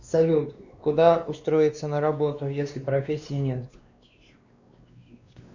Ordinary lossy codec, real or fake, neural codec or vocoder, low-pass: Opus, 64 kbps; fake; codec, 16 kHz, 2 kbps, X-Codec, WavLM features, trained on Multilingual LibriSpeech; 7.2 kHz